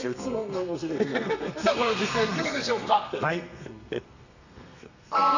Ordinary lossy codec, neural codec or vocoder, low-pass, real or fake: none; codec, 32 kHz, 1.9 kbps, SNAC; 7.2 kHz; fake